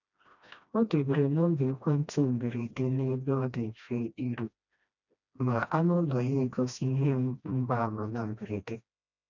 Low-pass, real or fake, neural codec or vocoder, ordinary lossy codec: 7.2 kHz; fake; codec, 16 kHz, 1 kbps, FreqCodec, smaller model; none